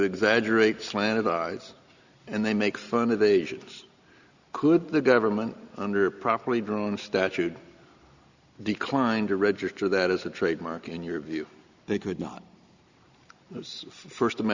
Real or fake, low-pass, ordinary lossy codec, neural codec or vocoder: real; 7.2 kHz; Opus, 64 kbps; none